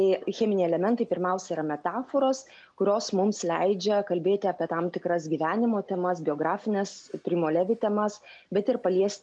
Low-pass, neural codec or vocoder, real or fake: 9.9 kHz; none; real